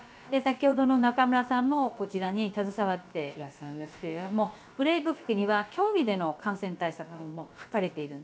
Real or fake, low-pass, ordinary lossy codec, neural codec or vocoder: fake; none; none; codec, 16 kHz, about 1 kbps, DyCAST, with the encoder's durations